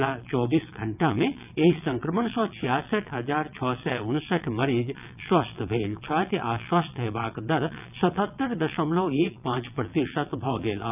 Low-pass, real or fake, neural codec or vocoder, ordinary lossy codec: 3.6 kHz; fake; vocoder, 22.05 kHz, 80 mel bands, WaveNeXt; none